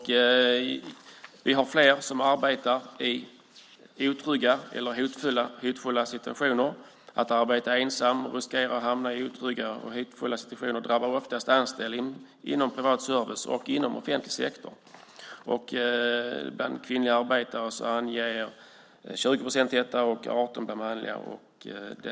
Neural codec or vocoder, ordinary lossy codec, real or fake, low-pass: none; none; real; none